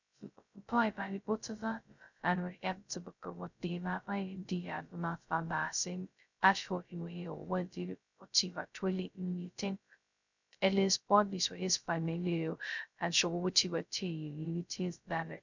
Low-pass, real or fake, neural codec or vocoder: 7.2 kHz; fake; codec, 16 kHz, 0.2 kbps, FocalCodec